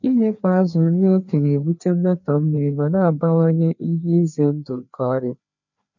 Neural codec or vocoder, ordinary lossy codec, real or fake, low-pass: codec, 16 kHz, 2 kbps, FreqCodec, larger model; none; fake; 7.2 kHz